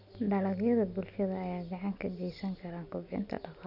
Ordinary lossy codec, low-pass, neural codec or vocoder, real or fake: none; 5.4 kHz; none; real